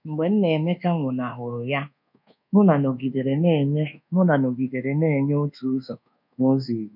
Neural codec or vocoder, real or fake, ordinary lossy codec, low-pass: codec, 24 kHz, 1.2 kbps, DualCodec; fake; none; 5.4 kHz